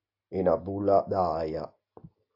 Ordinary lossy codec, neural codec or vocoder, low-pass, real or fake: AAC, 48 kbps; none; 5.4 kHz; real